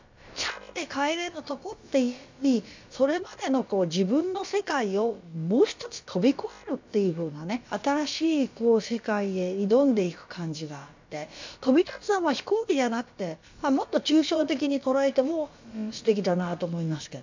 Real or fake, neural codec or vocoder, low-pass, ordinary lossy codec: fake; codec, 16 kHz, about 1 kbps, DyCAST, with the encoder's durations; 7.2 kHz; MP3, 64 kbps